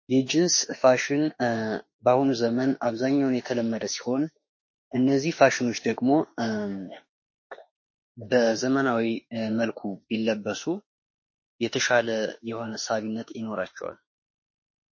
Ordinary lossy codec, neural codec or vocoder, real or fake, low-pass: MP3, 32 kbps; autoencoder, 48 kHz, 32 numbers a frame, DAC-VAE, trained on Japanese speech; fake; 7.2 kHz